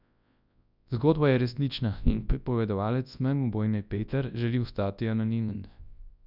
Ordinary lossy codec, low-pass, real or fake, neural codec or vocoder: none; 5.4 kHz; fake; codec, 24 kHz, 0.9 kbps, WavTokenizer, large speech release